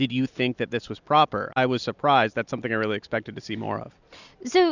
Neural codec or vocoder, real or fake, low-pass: none; real; 7.2 kHz